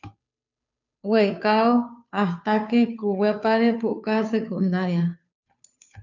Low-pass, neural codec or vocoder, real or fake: 7.2 kHz; codec, 16 kHz, 2 kbps, FunCodec, trained on Chinese and English, 25 frames a second; fake